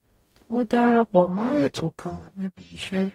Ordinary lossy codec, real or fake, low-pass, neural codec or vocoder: AAC, 48 kbps; fake; 19.8 kHz; codec, 44.1 kHz, 0.9 kbps, DAC